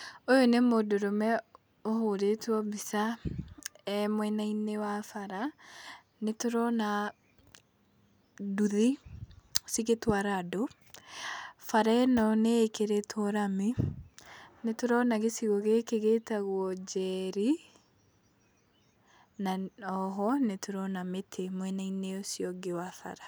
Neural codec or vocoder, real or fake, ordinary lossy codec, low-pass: none; real; none; none